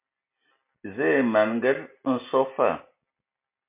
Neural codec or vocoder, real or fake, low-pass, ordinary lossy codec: none; real; 3.6 kHz; MP3, 24 kbps